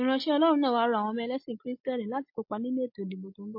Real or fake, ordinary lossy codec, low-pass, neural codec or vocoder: real; MP3, 32 kbps; 5.4 kHz; none